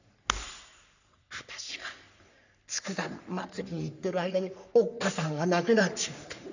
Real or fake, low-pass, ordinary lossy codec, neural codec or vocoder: fake; 7.2 kHz; none; codec, 44.1 kHz, 3.4 kbps, Pupu-Codec